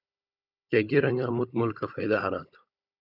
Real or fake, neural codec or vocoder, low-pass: fake; codec, 16 kHz, 16 kbps, FunCodec, trained on Chinese and English, 50 frames a second; 5.4 kHz